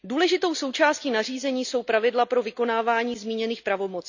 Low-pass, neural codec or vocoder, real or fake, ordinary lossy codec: 7.2 kHz; none; real; none